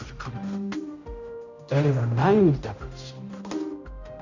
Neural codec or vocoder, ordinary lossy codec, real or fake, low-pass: codec, 16 kHz, 0.5 kbps, X-Codec, HuBERT features, trained on general audio; none; fake; 7.2 kHz